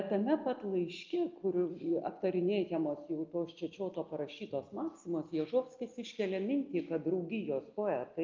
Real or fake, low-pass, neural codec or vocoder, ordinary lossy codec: real; 7.2 kHz; none; Opus, 24 kbps